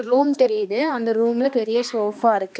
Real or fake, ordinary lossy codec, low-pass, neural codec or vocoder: fake; none; none; codec, 16 kHz, 2 kbps, X-Codec, HuBERT features, trained on balanced general audio